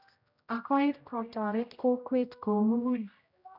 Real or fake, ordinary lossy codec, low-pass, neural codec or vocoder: fake; none; 5.4 kHz; codec, 16 kHz, 0.5 kbps, X-Codec, HuBERT features, trained on general audio